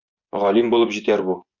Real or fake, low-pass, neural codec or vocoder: fake; 7.2 kHz; vocoder, 24 kHz, 100 mel bands, Vocos